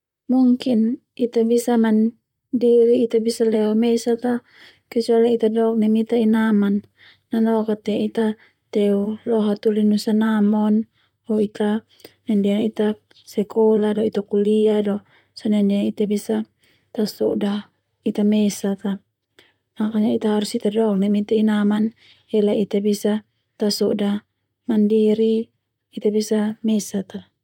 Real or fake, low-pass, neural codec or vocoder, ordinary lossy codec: fake; 19.8 kHz; vocoder, 44.1 kHz, 128 mel bands, Pupu-Vocoder; none